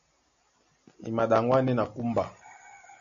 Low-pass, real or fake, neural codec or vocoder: 7.2 kHz; real; none